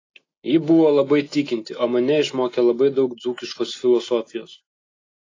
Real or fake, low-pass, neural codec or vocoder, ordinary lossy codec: real; 7.2 kHz; none; AAC, 32 kbps